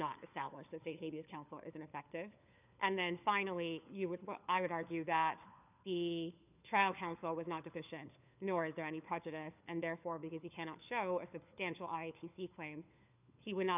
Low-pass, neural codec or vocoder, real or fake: 3.6 kHz; codec, 16 kHz, 4 kbps, FunCodec, trained on LibriTTS, 50 frames a second; fake